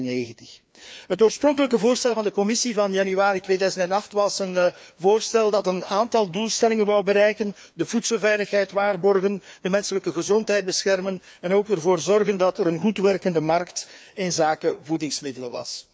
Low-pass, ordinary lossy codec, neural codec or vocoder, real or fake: none; none; codec, 16 kHz, 2 kbps, FreqCodec, larger model; fake